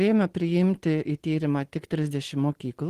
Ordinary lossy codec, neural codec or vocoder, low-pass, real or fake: Opus, 16 kbps; none; 14.4 kHz; real